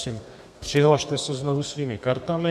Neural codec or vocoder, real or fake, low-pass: codec, 32 kHz, 1.9 kbps, SNAC; fake; 14.4 kHz